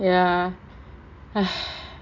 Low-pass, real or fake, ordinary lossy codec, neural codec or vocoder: 7.2 kHz; real; MP3, 64 kbps; none